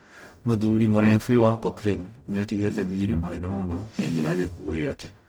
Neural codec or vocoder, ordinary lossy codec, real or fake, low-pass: codec, 44.1 kHz, 0.9 kbps, DAC; none; fake; none